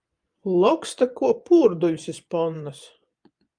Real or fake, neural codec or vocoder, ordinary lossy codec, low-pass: fake; vocoder, 24 kHz, 100 mel bands, Vocos; Opus, 32 kbps; 9.9 kHz